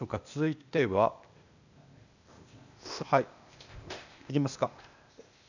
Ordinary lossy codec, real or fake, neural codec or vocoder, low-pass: none; fake; codec, 16 kHz, 0.8 kbps, ZipCodec; 7.2 kHz